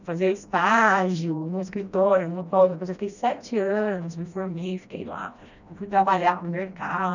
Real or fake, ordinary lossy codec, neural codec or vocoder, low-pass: fake; none; codec, 16 kHz, 1 kbps, FreqCodec, smaller model; 7.2 kHz